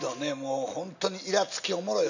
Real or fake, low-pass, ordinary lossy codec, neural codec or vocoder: real; 7.2 kHz; none; none